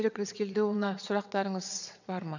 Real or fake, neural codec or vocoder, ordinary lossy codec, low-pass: fake; vocoder, 22.05 kHz, 80 mel bands, WaveNeXt; none; 7.2 kHz